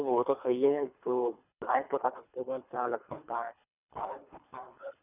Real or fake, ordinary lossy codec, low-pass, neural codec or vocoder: fake; none; 3.6 kHz; codec, 24 kHz, 3 kbps, HILCodec